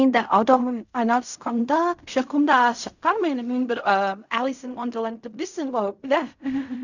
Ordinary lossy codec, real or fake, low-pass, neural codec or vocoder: none; fake; 7.2 kHz; codec, 16 kHz in and 24 kHz out, 0.4 kbps, LongCat-Audio-Codec, fine tuned four codebook decoder